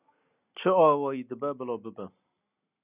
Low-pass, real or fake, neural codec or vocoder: 3.6 kHz; real; none